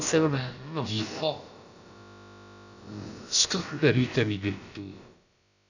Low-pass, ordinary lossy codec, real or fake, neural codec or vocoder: 7.2 kHz; none; fake; codec, 16 kHz, about 1 kbps, DyCAST, with the encoder's durations